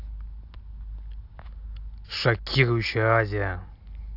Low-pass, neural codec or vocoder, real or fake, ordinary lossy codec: 5.4 kHz; none; real; AAC, 48 kbps